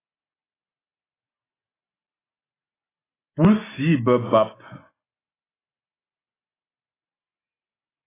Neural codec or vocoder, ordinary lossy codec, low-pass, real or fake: none; AAC, 16 kbps; 3.6 kHz; real